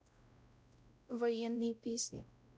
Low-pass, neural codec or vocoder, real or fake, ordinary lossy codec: none; codec, 16 kHz, 0.5 kbps, X-Codec, WavLM features, trained on Multilingual LibriSpeech; fake; none